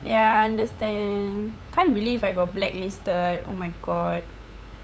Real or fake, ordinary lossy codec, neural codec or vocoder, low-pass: fake; none; codec, 16 kHz, 8 kbps, FunCodec, trained on LibriTTS, 25 frames a second; none